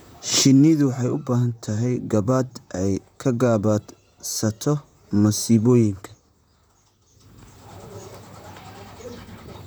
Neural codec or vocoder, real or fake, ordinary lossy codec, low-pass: vocoder, 44.1 kHz, 128 mel bands, Pupu-Vocoder; fake; none; none